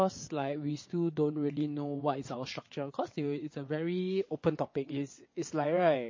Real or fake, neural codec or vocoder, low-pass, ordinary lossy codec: fake; vocoder, 22.05 kHz, 80 mel bands, WaveNeXt; 7.2 kHz; MP3, 32 kbps